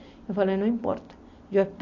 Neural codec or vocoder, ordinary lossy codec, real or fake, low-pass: none; AAC, 48 kbps; real; 7.2 kHz